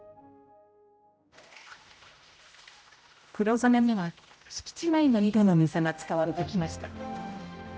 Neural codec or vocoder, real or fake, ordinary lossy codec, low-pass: codec, 16 kHz, 0.5 kbps, X-Codec, HuBERT features, trained on general audio; fake; none; none